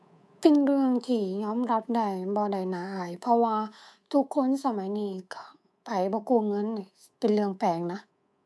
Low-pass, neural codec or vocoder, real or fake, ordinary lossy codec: none; codec, 24 kHz, 3.1 kbps, DualCodec; fake; none